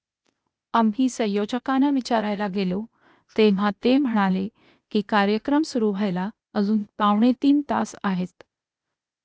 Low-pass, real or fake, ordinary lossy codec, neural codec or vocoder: none; fake; none; codec, 16 kHz, 0.8 kbps, ZipCodec